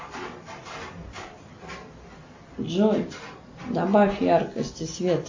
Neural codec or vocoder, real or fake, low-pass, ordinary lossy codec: none; real; 7.2 kHz; MP3, 32 kbps